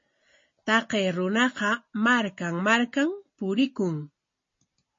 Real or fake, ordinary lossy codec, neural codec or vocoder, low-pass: real; MP3, 32 kbps; none; 7.2 kHz